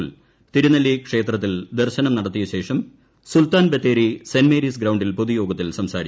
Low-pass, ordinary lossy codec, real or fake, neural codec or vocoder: none; none; real; none